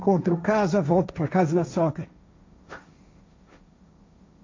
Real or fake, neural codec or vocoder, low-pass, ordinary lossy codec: fake; codec, 16 kHz, 1.1 kbps, Voila-Tokenizer; 7.2 kHz; MP3, 48 kbps